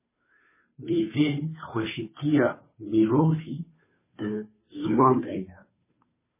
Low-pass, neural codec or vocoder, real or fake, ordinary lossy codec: 3.6 kHz; codec, 16 kHz, 2 kbps, FunCodec, trained on Chinese and English, 25 frames a second; fake; MP3, 16 kbps